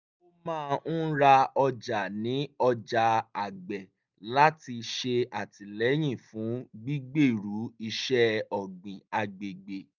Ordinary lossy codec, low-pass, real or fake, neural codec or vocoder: none; 7.2 kHz; real; none